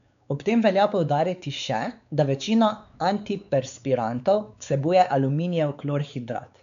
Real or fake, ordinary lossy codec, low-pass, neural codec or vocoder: fake; MP3, 96 kbps; 7.2 kHz; codec, 16 kHz, 4 kbps, X-Codec, WavLM features, trained on Multilingual LibriSpeech